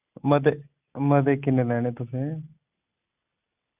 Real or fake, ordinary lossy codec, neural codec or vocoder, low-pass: real; Opus, 64 kbps; none; 3.6 kHz